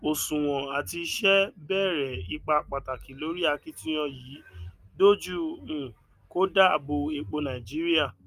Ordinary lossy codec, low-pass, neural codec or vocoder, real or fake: Opus, 32 kbps; 14.4 kHz; none; real